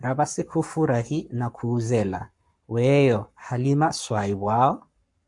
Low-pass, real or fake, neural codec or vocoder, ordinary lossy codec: 10.8 kHz; fake; codec, 44.1 kHz, 7.8 kbps, Pupu-Codec; MP3, 64 kbps